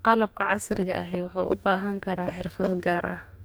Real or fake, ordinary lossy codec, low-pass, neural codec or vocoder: fake; none; none; codec, 44.1 kHz, 2.6 kbps, DAC